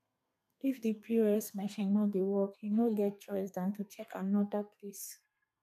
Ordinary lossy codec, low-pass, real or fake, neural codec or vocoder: none; 14.4 kHz; fake; codec, 32 kHz, 1.9 kbps, SNAC